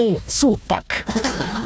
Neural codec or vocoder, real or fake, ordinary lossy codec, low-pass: codec, 16 kHz, 1 kbps, FunCodec, trained on Chinese and English, 50 frames a second; fake; none; none